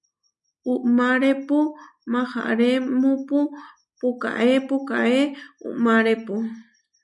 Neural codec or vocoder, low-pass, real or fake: none; 10.8 kHz; real